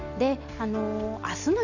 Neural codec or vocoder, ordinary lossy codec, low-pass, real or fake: none; none; 7.2 kHz; real